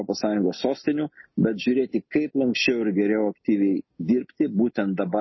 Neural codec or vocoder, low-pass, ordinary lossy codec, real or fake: none; 7.2 kHz; MP3, 24 kbps; real